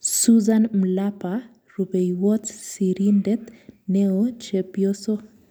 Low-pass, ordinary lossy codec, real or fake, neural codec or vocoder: none; none; real; none